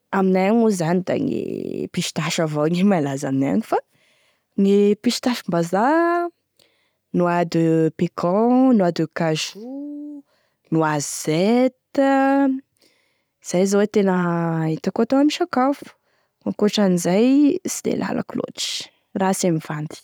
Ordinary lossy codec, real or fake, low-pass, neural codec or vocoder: none; real; none; none